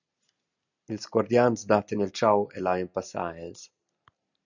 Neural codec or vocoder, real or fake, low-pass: none; real; 7.2 kHz